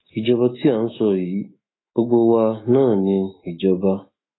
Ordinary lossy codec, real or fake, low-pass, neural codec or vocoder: AAC, 16 kbps; fake; 7.2 kHz; autoencoder, 48 kHz, 128 numbers a frame, DAC-VAE, trained on Japanese speech